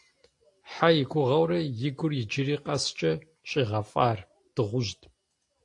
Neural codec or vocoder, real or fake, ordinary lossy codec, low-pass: none; real; AAC, 48 kbps; 10.8 kHz